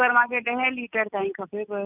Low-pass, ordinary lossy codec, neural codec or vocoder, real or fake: 3.6 kHz; none; none; real